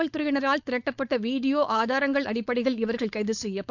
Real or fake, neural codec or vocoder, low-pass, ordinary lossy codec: fake; codec, 16 kHz, 4.8 kbps, FACodec; 7.2 kHz; none